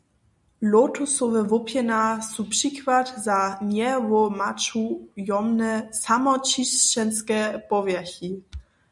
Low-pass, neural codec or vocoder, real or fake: 10.8 kHz; none; real